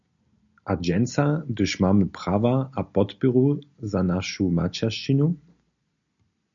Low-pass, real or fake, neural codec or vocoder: 7.2 kHz; real; none